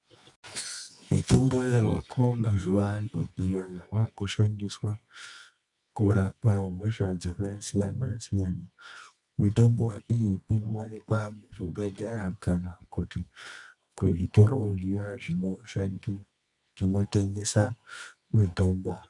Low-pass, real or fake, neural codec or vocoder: 10.8 kHz; fake; codec, 24 kHz, 0.9 kbps, WavTokenizer, medium music audio release